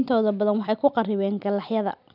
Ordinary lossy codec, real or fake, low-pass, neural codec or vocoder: none; real; 5.4 kHz; none